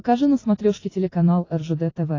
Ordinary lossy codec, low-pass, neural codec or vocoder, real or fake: AAC, 32 kbps; 7.2 kHz; none; real